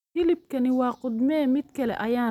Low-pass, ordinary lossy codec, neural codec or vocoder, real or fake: 19.8 kHz; none; none; real